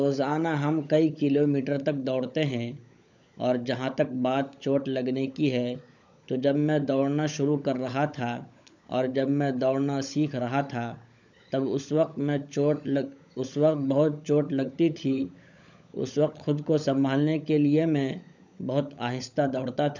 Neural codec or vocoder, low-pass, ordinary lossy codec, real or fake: codec, 16 kHz, 16 kbps, FunCodec, trained on LibriTTS, 50 frames a second; 7.2 kHz; none; fake